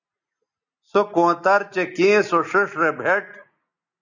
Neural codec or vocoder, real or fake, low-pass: none; real; 7.2 kHz